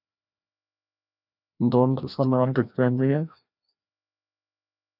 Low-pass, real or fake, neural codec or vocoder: 5.4 kHz; fake; codec, 16 kHz, 1 kbps, FreqCodec, larger model